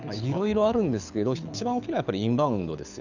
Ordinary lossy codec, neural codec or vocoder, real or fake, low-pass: none; codec, 24 kHz, 6 kbps, HILCodec; fake; 7.2 kHz